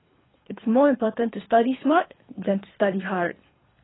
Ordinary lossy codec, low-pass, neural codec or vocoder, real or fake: AAC, 16 kbps; 7.2 kHz; codec, 24 kHz, 3 kbps, HILCodec; fake